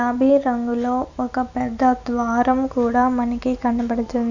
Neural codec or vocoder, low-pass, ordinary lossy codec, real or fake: none; 7.2 kHz; none; real